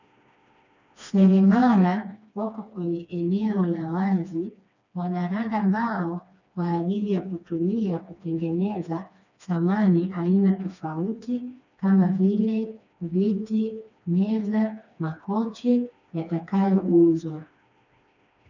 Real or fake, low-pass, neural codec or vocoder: fake; 7.2 kHz; codec, 16 kHz, 2 kbps, FreqCodec, smaller model